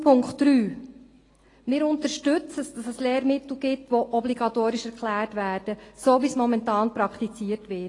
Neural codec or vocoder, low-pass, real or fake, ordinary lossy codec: none; 10.8 kHz; real; AAC, 32 kbps